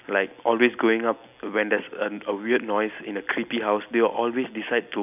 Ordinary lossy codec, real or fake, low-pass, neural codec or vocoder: none; real; 3.6 kHz; none